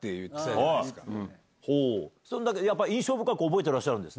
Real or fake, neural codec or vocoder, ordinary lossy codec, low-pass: real; none; none; none